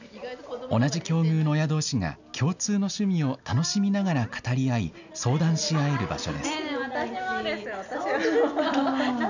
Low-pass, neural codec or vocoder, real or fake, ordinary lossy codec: 7.2 kHz; none; real; none